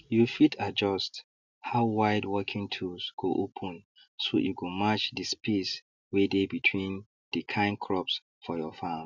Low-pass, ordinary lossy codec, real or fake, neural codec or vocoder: 7.2 kHz; none; real; none